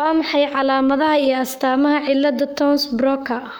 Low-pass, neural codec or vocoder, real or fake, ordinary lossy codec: none; codec, 44.1 kHz, 7.8 kbps, Pupu-Codec; fake; none